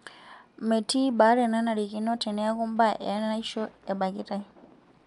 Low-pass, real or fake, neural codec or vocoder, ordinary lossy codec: 10.8 kHz; real; none; MP3, 96 kbps